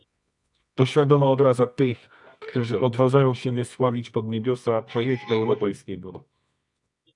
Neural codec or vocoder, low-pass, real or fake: codec, 24 kHz, 0.9 kbps, WavTokenizer, medium music audio release; 10.8 kHz; fake